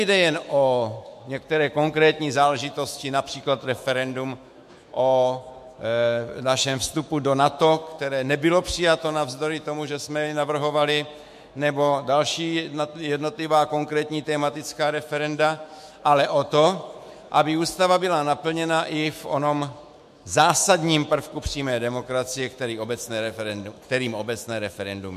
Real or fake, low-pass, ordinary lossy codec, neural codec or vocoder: fake; 14.4 kHz; MP3, 64 kbps; autoencoder, 48 kHz, 128 numbers a frame, DAC-VAE, trained on Japanese speech